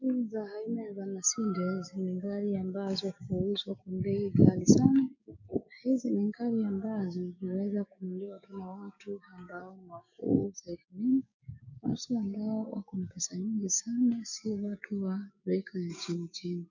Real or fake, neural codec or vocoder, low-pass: fake; codec, 16 kHz, 6 kbps, DAC; 7.2 kHz